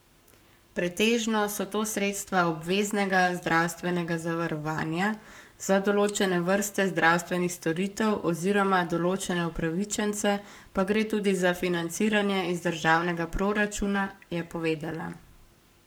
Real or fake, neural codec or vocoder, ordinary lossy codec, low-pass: fake; codec, 44.1 kHz, 7.8 kbps, Pupu-Codec; none; none